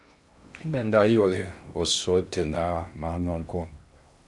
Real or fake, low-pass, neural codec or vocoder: fake; 10.8 kHz; codec, 16 kHz in and 24 kHz out, 0.6 kbps, FocalCodec, streaming, 2048 codes